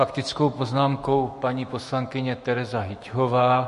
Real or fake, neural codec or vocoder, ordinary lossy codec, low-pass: fake; autoencoder, 48 kHz, 128 numbers a frame, DAC-VAE, trained on Japanese speech; MP3, 48 kbps; 14.4 kHz